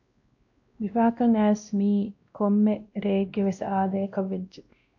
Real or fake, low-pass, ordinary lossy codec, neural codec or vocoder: fake; 7.2 kHz; AAC, 48 kbps; codec, 16 kHz, 1 kbps, X-Codec, WavLM features, trained on Multilingual LibriSpeech